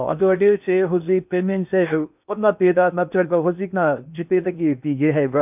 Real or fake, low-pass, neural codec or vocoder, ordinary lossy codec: fake; 3.6 kHz; codec, 16 kHz in and 24 kHz out, 0.8 kbps, FocalCodec, streaming, 65536 codes; none